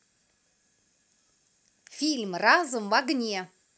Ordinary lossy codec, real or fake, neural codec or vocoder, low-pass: none; real; none; none